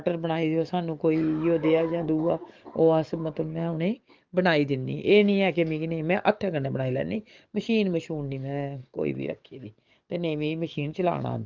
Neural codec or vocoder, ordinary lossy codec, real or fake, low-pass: codec, 44.1 kHz, 7.8 kbps, DAC; Opus, 24 kbps; fake; 7.2 kHz